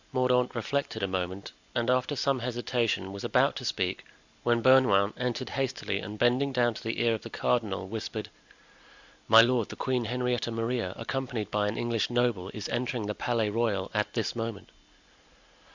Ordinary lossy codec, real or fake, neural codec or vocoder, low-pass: Opus, 64 kbps; real; none; 7.2 kHz